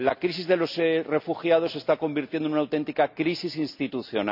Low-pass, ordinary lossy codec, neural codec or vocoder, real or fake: 5.4 kHz; none; none; real